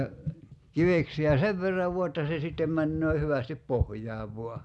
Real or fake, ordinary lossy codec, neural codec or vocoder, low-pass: real; none; none; none